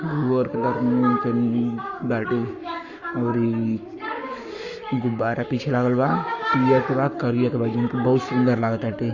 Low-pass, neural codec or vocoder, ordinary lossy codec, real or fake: 7.2 kHz; codec, 44.1 kHz, 7.8 kbps, Pupu-Codec; Opus, 64 kbps; fake